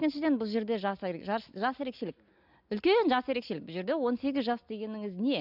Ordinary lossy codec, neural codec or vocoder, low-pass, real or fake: none; none; 5.4 kHz; real